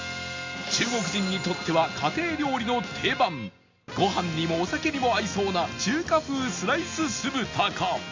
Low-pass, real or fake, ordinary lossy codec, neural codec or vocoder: 7.2 kHz; real; MP3, 64 kbps; none